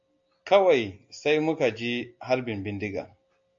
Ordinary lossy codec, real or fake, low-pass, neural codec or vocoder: AAC, 64 kbps; real; 7.2 kHz; none